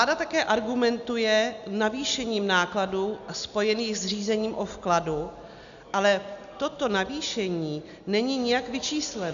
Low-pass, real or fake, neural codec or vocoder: 7.2 kHz; real; none